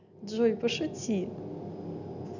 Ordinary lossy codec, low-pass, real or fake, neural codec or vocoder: none; 7.2 kHz; real; none